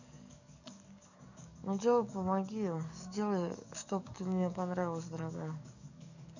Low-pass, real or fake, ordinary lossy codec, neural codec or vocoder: 7.2 kHz; fake; none; codec, 44.1 kHz, 7.8 kbps, DAC